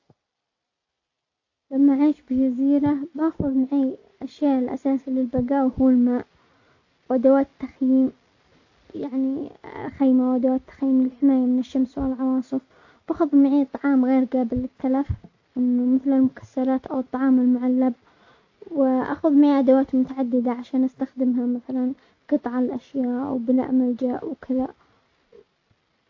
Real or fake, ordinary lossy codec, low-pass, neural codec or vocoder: real; AAC, 48 kbps; 7.2 kHz; none